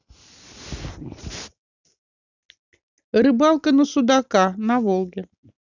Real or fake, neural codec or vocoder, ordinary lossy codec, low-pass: real; none; none; 7.2 kHz